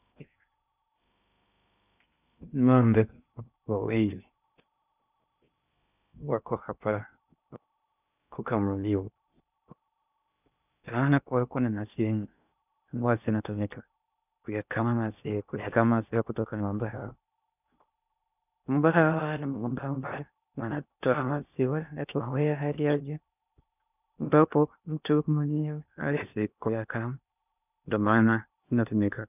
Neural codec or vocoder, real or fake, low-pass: codec, 16 kHz in and 24 kHz out, 0.6 kbps, FocalCodec, streaming, 2048 codes; fake; 3.6 kHz